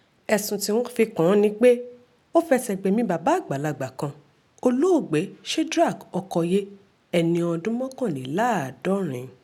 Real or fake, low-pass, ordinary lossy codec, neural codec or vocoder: real; 19.8 kHz; none; none